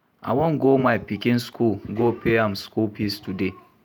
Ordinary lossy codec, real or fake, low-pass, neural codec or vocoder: none; fake; none; vocoder, 48 kHz, 128 mel bands, Vocos